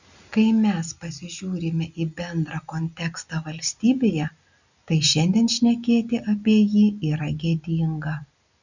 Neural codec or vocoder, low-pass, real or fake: none; 7.2 kHz; real